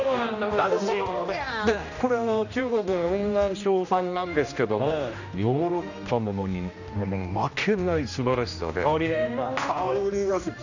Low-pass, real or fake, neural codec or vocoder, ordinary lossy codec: 7.2 kHz; fake; codec, 16 kHz, 1 kbps, X-Codec, HuBERT features, trained on general audio; none